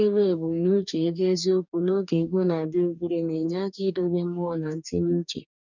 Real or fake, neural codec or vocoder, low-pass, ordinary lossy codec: fake; codec, 44.1 kHz, 2.6 kbps, DAC; 7.2 kHz; none